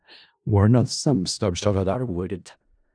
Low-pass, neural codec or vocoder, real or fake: 9.9 kHz; codec, 16 kHz in and 24 kHz out, 0.4 kbps, LongCat-Audio-Codec, four codebook decoder; fake